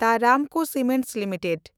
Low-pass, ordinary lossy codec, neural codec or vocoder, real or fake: none; none; autoencoder, 48 kHz, 128 numbers a frame, DAC-VAE, trained on Japanese speech; fake